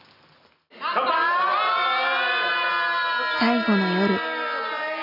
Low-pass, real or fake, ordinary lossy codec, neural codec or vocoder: 5.4 kHz; real; none; none